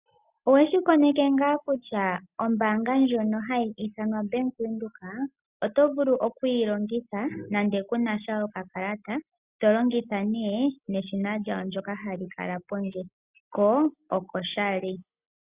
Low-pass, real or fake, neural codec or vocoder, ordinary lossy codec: 3.6 kHz; real; none; Opus, 64 kbps